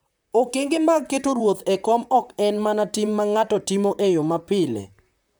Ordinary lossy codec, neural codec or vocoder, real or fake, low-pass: none; vocoder, 44.1 kHz, 128 mel bands, Pupu-Vocoder; fake; none